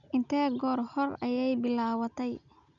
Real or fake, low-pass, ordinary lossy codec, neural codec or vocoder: real; 7.2 kHz; none; none